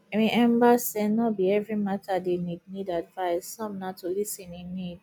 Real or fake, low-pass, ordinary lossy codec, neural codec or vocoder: real; none; none; none